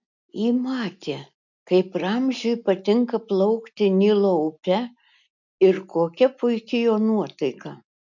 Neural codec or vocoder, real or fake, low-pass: none; real; 7.2 kHz